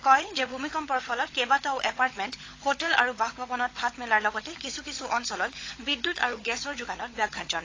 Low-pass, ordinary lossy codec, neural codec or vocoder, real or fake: 7.2 kHz; AAC, 32 kbps; codec, 16 kHz, 16 kbps, FunCodec, trained on LibriTTS, 50 frames a second; fake